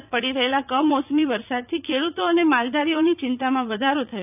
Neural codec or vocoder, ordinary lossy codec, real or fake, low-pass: vocoder, 44.1 kHz, 80 mel bands, Vocos; none; fake; 3.6 kHz